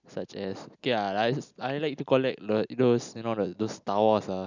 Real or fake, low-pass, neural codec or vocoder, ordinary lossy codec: real; 7.2 kHz; none; none